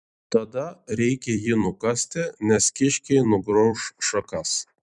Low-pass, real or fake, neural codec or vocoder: 10.8 kHz; fake; vocoder, 44.1 kHz, 128 mel bands every 256 samples, BigVGAN v2